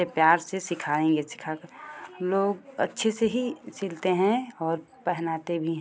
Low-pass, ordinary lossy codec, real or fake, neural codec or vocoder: none; none; real; none